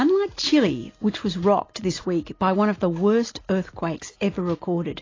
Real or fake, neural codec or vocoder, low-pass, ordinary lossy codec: real; none; 7.2 kHz; AAC, 32 kbps